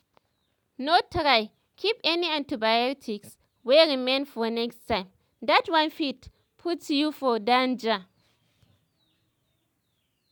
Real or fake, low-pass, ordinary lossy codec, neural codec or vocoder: real; none; none; none